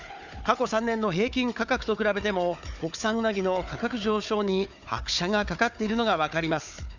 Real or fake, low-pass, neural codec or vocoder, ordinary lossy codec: fake; 7.2 kHz; codec, 16 kHz, 4 kbps, FunCodec, trained on Chinese and English, 50 frames a second; none